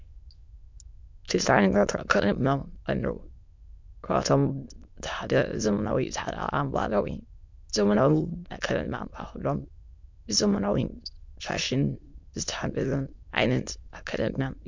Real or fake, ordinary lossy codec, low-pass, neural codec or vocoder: fake; MP3, 64 kbps; 7.2 kHz; autoencoder, 22.05 kHz, a latent of 192 numbers a frame, VITS, trained on many speakers